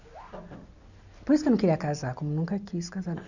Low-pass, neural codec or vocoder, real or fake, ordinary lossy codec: 7.2 kHz; none; real; none